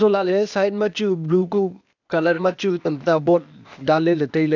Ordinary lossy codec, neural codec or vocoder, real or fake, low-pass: none; codec, 16 kHz, 0.8 kbps, ZipCodec; fake; 7.2 kHz